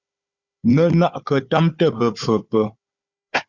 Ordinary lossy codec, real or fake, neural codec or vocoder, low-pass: Opus, 64 kbps; fake; codec, 16 kHz, 4 kbps, FunCodec, trained on Chinese and English, 50 frames a second; 7.2 kHz